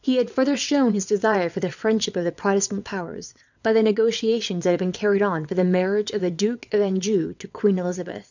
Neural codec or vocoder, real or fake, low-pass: vocoder, 22.05 kHz, 80 mel bands, WaveNeXt; fake; 7.2 kHz